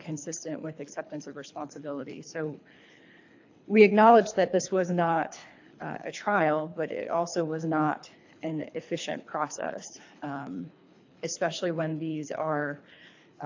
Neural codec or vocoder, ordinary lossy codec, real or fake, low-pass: codec, 24 kHz, 3 kbps, HILCodec; AAC, 48 kbps; fake; 7.2 kHz